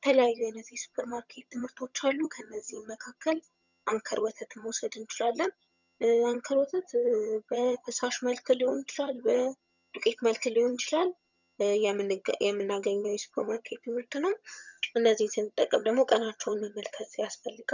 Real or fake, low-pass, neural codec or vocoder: fake; 7.2 kHz; vocoder, 22.05 kHz, 80 mel bands, HiFi-GAN